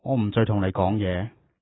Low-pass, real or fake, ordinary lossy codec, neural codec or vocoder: 7.2 kHz; real; AAC, 16 kbps; none